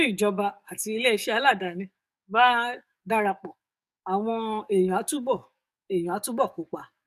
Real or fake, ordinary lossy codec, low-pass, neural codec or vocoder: fake; none; 14.4 kHz; codec, 44.1 kHz, 7.8 kbps, DAC